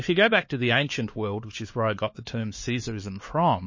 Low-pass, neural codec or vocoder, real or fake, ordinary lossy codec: 7.2 kHz; codec, 16 kHz, 4 kbps, X-Codec, HuBERT features, trained on LibriSpeech; fake; MP3, 32 kbps